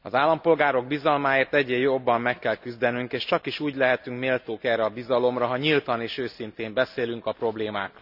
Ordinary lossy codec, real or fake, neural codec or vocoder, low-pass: none; real; none; 5.4 kHz